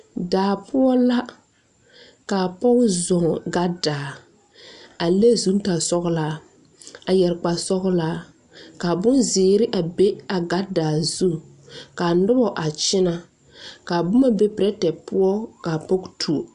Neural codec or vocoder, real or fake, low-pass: none; real; 10.8 kHz